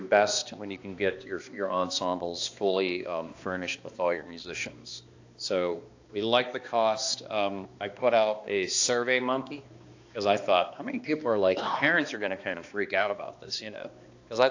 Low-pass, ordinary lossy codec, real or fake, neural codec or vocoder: 7.2 kHz; AAC, 48 kbps; fake; codec, 16 kHz, 2 kbps, X-Codec, HuBERT features, trained on balanced general audio